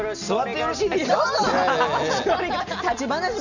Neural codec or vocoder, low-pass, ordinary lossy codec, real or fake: none; 7.2 kHz; none; real